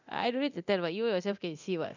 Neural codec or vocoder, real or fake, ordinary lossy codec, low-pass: codec, 24 kHz, 0.9 kbps, DualCodec; fake; none; 7.2 kHz